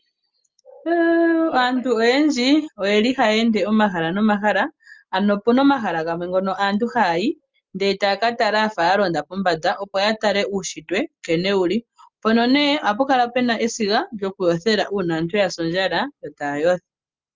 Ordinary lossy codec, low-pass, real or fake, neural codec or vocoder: Opus, 32 kbps; 7.2 kHz; real; none